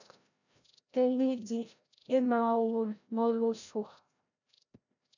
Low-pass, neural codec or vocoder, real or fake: 7.2 kHz; codec, 16 kHz, 0.5 kbps, FreqCodec, larger model; fake